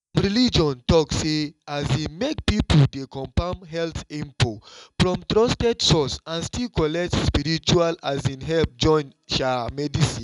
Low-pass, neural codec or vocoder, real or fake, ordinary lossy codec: 10.8 kHz; none; real; none